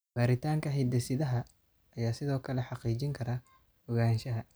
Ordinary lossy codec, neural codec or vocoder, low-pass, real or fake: none; none; none; real